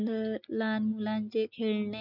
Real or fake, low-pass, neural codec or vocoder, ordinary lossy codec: real; 5.4 kHz; none; none